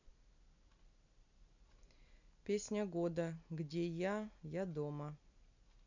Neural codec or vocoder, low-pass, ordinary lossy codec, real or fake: none; 7.2 kHz; none; real